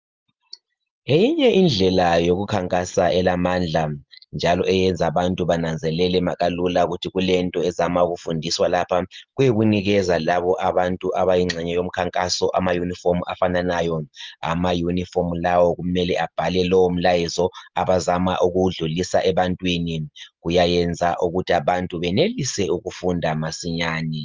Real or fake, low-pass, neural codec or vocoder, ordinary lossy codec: real; 7.2 kHz; none; Opus, 24 kbps